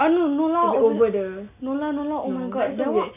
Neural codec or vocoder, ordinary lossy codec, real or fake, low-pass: none; none; real; 3.6 kHz